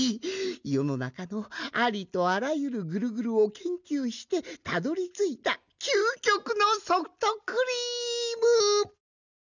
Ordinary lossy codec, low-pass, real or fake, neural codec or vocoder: none; 7.2 kHz; real; none